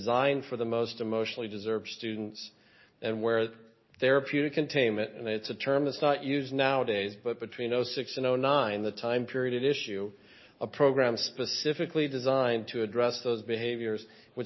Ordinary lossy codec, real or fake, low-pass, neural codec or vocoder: MP3, 24 kbps; real; 7.2 kHz; none